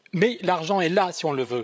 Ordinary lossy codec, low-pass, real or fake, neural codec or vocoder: none; none; fake; codec, 16 kHz, 16 kbps, FreqCodec, larger model